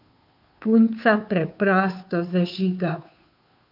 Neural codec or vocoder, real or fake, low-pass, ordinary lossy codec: codec, 16 kHz, 2 kbps, FunCodec, trained on Chinese and English, 25 frames a second; fake; 5.4 kHz; none